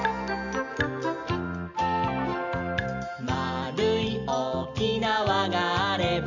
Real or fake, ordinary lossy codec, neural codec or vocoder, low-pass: real; none; none; 7.2 kHz